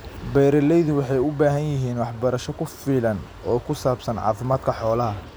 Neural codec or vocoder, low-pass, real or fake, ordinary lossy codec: none; none; real; none